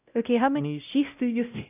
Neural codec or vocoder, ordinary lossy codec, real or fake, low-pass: codec, 16 kHz, 0.5 kbps, X-Codec, WavLM features, trained on Multilingual LibriSpeech; none; fake; 3.6 kHz